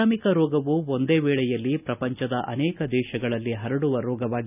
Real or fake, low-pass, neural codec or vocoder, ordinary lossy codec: real; 3.6 kHz; none; none